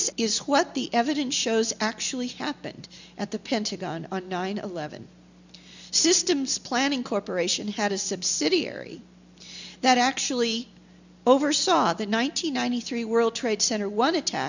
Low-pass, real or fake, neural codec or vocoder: 7.2 kHz; real; none